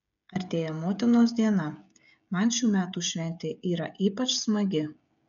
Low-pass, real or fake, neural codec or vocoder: 7.2 kHz; fake; codec, 16 kHz, 16 kbps, FreqCodec, smaller model